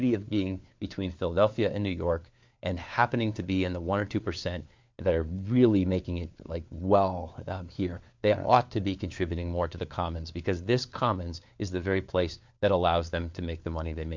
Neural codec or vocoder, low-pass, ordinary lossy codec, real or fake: codec, 16 kHz, 4 kbps, FunCodec, trained on LibriTTS, 50 frames a second; 7.2 kHz; MP3, 64 kbps; fake